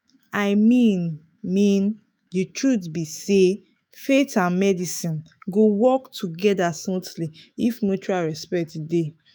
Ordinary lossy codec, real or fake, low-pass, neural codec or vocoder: none; fake; none; autoencoder, 48 kHz, 128 numbers a frame, DAC-VAE, trained on Japanese speech